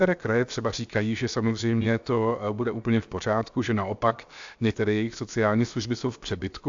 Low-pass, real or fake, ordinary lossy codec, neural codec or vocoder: 7.2 kHz; fake; AAC, 64 kbps; codec, 16 kHz, 0.7 kbps, FocalCodec